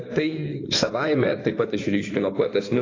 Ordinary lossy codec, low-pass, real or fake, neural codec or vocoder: AAC, 32 kbps; 7.2 kHz; fake; codec, 16 kHz in and 24 kHz out, 2.2 kbps, FireRedTTS-2 codec